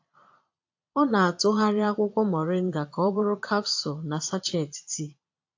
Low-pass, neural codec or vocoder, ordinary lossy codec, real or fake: 7.2 kHz; vocoder, 22.05 kHz, 80 mel bands, Vocos; AAC, 48 kbps; fake